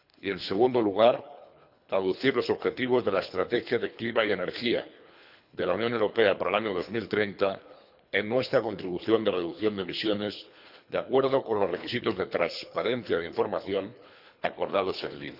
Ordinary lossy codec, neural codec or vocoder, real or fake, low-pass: none; codec, 24 kHz, 3 kbps, HILCodec; fake; 5.4 kHz